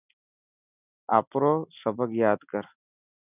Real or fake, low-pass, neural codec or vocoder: real; 3.6 kHz; none